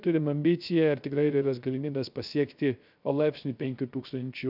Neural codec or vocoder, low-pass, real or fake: codec, 16 kHz, 0.3 kbps, FocalCodec; 5.4 kHz; fake